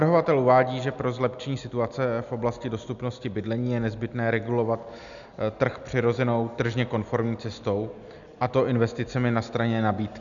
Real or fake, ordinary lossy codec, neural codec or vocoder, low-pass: real; MP3, 96 kbps; none; 7.2 kHz